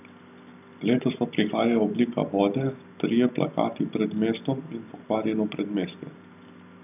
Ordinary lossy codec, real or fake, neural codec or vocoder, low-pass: none; real; none; 3.6 kHz